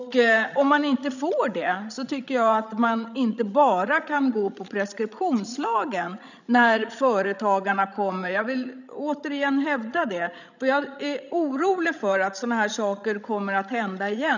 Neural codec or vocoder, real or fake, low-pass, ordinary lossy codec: codec, 16 kHz, 16 kbps, FreqCodec, larger model; fake; 7.2 kHz; none